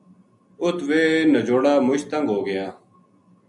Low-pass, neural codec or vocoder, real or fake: 10.8 kHz; none; real